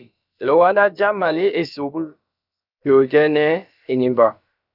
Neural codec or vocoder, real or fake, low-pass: codec, 16 kHz, about 1 kbps, DyCAST, with the encoder's durations; fake; 5.4 kHz